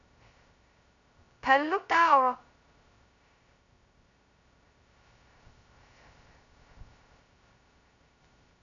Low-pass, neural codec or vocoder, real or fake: 7.2 kHz; codec, 16 kHz, 0.2 kbps, FocalCodec; fake